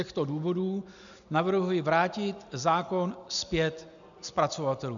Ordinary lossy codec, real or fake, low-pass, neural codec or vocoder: MP3, 96 kbps; real; 7.2 kHz; none